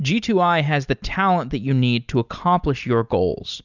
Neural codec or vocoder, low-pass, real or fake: none; 7.2 kHz; real